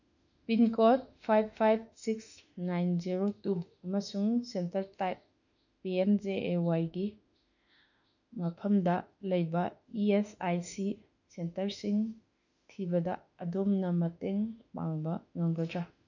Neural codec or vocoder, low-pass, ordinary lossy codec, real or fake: autoencoder, 48 kHz, 32 numbers a frame, DAC-VAE, trained on Japanese speech; 7.2 kHz; none; fake